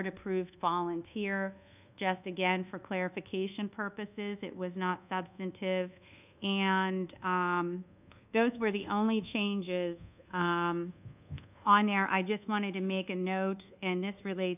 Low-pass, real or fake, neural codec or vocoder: 3.6 kHz; fake; codec, 24 kHz, 1.2 kbps, DualCodec